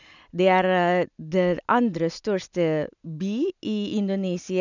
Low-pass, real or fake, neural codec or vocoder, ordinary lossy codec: 7.2 kHz; real; none; none